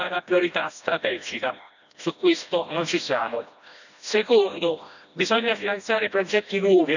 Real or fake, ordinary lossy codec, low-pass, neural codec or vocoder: fake; none; 7.2 kHz; codec, 16 kHz, 1 kbps, FreqCodec, smaller model